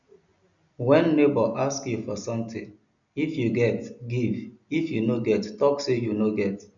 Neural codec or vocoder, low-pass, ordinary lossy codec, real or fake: none; 7.2 kHz; none; real